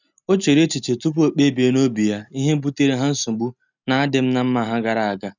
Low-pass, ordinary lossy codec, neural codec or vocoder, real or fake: 7.2 kHz; none; none; real